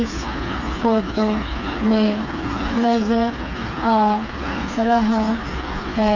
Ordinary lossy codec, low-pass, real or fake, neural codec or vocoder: none; 7.2 kHz; fake; codec, 16 kHz, 4 kbps, FreqCodec, smaller model